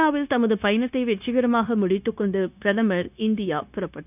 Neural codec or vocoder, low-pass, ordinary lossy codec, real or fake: codec, 16 kHz, 0.9 kbps, LongCat-Audio-Codec; 3.6 kHz; none; fake